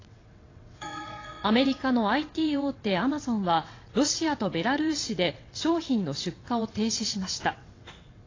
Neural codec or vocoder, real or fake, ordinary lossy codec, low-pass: vocoder, 22.05 kHz, 80 mel bands, WaveNeXt; fake; AAC, 32 kbps; 7.2 kHz